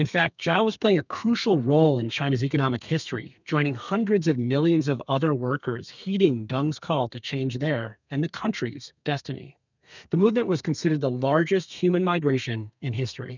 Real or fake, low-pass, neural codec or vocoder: fake; 7.2 kHz; codec, 32 kHz, 1.9 kbps, SNAC